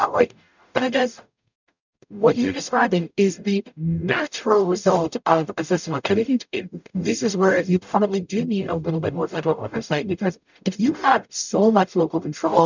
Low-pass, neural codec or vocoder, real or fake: 7.2 kHz; codec, 44.1 kHz, 0.9 kbps, DAC; fake